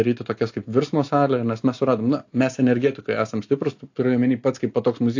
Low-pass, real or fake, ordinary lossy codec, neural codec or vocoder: 7.2 kHz; real; AAC, 48 kbps; none